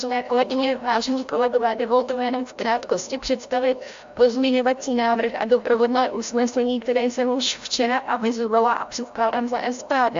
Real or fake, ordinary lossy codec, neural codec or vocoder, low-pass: fake; AAC, 64 kbps; codec, 16 kHz, 0.5 kbps, FreqCodec, larger model; 7.2 kHz